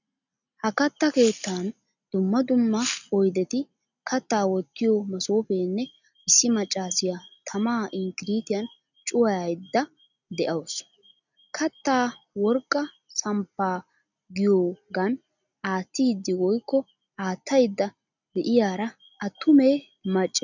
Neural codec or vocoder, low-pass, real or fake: none; 7.2 kHz; real